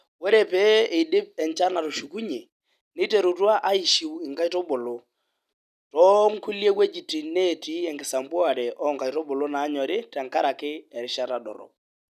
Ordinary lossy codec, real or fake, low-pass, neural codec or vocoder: none; real; 14.4 kHz; none